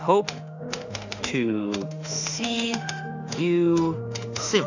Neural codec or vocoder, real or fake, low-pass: autoencoder, 48 kHz, 32 numbers a frame, DAC-VAE, trained on Japanese speech; fake; 7.2 kHz